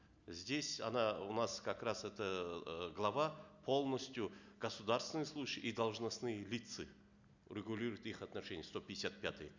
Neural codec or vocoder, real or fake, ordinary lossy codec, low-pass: none; real; none; 7.2 kHz